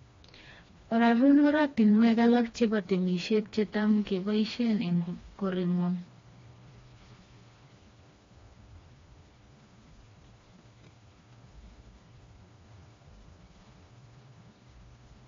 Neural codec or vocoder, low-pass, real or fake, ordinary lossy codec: codec, 16 kHz, 2 kbps, FreqCodec, smaller model; 7.2 kHz; fake; AAC, 32 kbps